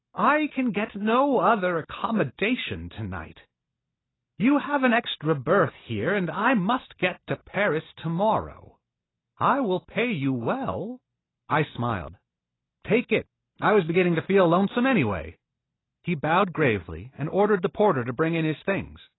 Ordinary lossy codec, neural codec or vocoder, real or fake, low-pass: AAC, 16 kbps; none; real; 7.2 kHz